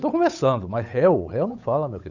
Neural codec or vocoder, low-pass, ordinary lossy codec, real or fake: codec, 16 kHz, 8 kbps, FunCodec, trained on Chinese and English, 25 frames a second; 7.2 kHz; none; fake